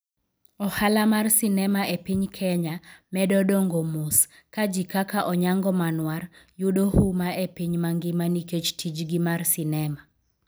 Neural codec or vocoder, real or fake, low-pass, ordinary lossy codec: none; real; none; none